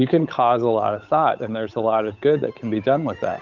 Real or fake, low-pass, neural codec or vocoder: fake; 7.2 kHz; codec, 16 kHz, 8 kbps, FunCodec, trained on Chinese and English, 25 frames a second